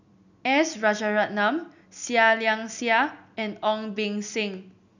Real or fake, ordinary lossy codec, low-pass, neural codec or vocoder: real; none; 7.2 kHz; none